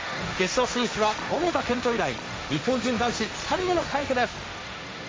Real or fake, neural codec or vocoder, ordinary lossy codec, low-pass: fake; codec, 16 kHz, 1.1 kbps, Voila-Tokenizer; none; none